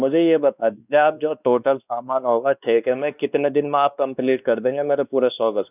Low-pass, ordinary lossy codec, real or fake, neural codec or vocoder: 3.6 kHz; none; fake; codec, 16 kHz, 2 kbps, X-Codec, WavLM features, trained on Multilingual LibriSpeech